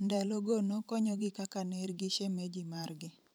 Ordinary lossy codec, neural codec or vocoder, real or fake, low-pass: none; vocoder, 44.1 kHz, 128 mel bands every 512 samples, BigVGAN v2; fake; none